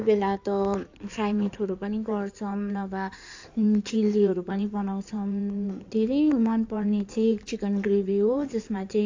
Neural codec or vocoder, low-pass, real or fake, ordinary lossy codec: codec, 16 kHz in and 24 kHz out, 2.2 kbps, FireRedTTS-2 codec; 7.2 kHz; fake; AAC, 48 kbps